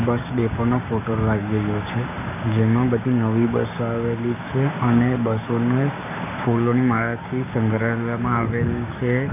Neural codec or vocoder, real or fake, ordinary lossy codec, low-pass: codec, 16 kHz, 6 kbps, DAC; fake; none; 3.6 kHz